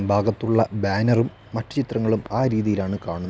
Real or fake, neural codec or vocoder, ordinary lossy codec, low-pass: real; none; none; none